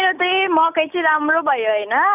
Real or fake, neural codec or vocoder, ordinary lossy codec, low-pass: real; none; none; 3.6 kHz